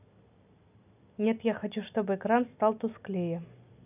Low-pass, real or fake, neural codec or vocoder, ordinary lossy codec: 3.6 kHz; real; none; none